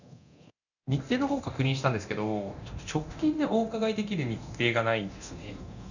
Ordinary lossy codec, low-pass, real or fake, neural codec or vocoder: none; 7.2 kHz; fake; codec, 24 kHz, 0.9 kbps, DualCodec